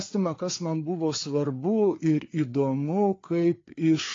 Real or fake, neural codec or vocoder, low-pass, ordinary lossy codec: fake; codec, 16 kHz, 4 kbps, FreqCodec, larger model; 7.2 kHz; AAC, 32 kbps